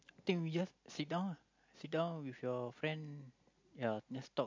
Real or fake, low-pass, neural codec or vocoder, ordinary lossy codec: real; 7.2 kHz; none; MP3, 48 kbps